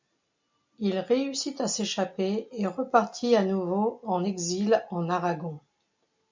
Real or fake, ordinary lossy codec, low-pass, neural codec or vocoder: real; MP3, 64 kbps; 7.2 kHz; none